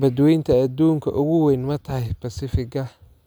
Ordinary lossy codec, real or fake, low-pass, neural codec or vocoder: none; real; none; none